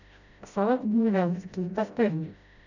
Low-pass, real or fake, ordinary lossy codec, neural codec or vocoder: 7.2 kHz; fake; none; codec, 16 kHz, 0.5 kbps, FreqCodec, smaller model